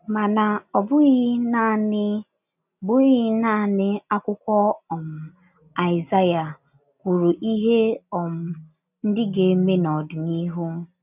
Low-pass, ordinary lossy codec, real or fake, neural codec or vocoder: 3.6 kHz; none; real; none